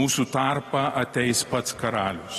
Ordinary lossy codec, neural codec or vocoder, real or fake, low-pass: AAC, 32 kbps; none; real; 19.8 kHz